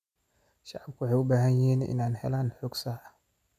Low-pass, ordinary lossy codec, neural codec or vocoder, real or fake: 14.4 kHz; none; none; real